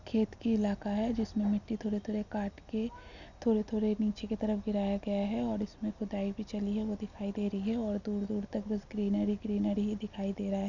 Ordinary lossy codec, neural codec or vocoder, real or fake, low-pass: none; none; real; 7.2 kHz